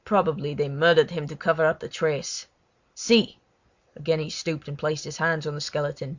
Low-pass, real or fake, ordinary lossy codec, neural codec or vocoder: 7.2 kHz; real; Opus, 64 kbps; none